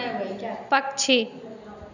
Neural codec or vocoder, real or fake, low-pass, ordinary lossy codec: none; real; 7.2 kHz; none